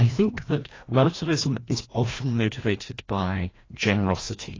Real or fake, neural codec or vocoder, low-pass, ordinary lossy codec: fake; codec, 16 kHz, 1 kbps, FreqCodec, larger model; 7.2 kHz; AAC, 32 kbps